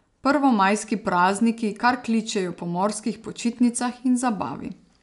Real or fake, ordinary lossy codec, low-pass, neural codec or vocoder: real; none; 10.8 kHz; none